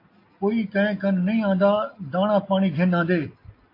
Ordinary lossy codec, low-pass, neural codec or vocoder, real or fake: AAC, 32 kbps; 5.4 kHz; none; real